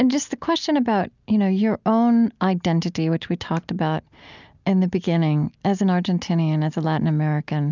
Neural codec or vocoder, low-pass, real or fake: none; 7.2 kHz; real